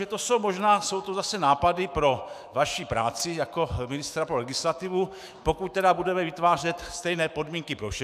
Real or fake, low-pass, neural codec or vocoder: fake; 14.4 kHz; autoencoder, 48 kHz, 128 numbers a frame, DAC-VAE, trained on Japanese speech